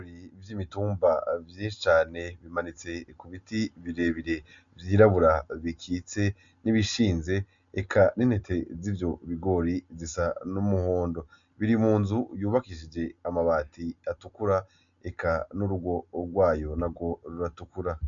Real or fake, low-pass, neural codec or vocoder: real; 7.2 kHz; none